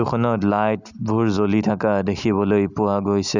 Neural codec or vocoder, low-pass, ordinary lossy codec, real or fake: none; 7.2 kHz; none; real